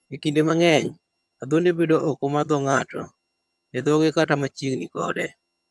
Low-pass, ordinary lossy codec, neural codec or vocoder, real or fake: none; none; vocoder, 22.05 kHz, 80 mel bands, HiFi-GAN; fake